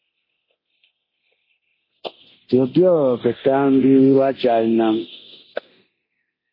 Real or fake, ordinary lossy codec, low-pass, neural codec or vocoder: fake; MP3, 24 kbps; 5.4 kHz; codec, 24 kHz, 0.9 kbps, DualCodec